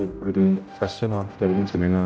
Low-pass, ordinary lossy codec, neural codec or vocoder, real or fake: none; none; codec, 16 kHz, 0.5 kbps, X-Codec, HuBERT features, trained on general audio; fake